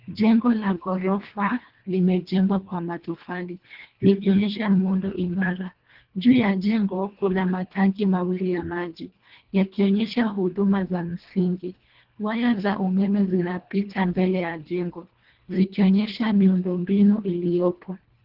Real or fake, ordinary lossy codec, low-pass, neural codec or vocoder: fake; Opus, 16 kbps; 5.4 kHz; codec, 24 kHz, 1.5 kbps, HILCodec